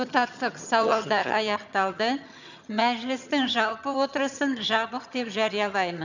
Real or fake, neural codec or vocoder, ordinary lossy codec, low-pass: fake; vocoder, 22.05 kHz, 80 mel bands, HiFi-GAN; none; 7.2 kHz